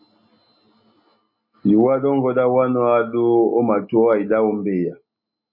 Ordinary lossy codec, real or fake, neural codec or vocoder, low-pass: MP3, 24 kbps; real; none; 5.4 kHz